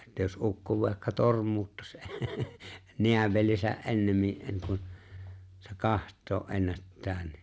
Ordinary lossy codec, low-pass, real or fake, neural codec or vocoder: none; none; real; none